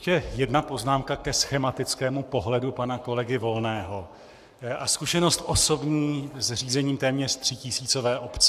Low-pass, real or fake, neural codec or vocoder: 14.4 kHz; fake; codec, 44.1 kHz, 7.8 kbps, Pupu-Codec